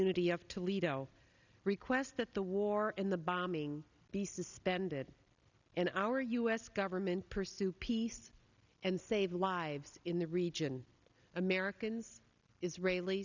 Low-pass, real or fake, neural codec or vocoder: 7.2 kHz; real; none